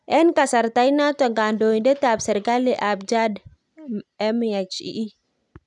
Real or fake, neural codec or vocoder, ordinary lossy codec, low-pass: real; none; none; 10.8 kHz